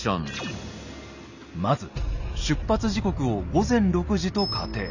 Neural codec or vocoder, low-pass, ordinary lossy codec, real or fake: none; 7.2 kHz; none; real